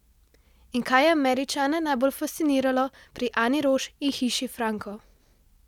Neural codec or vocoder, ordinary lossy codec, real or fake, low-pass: none; none; real; 19.8 kHz